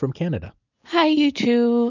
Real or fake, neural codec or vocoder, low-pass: real; none; 7.2 kHz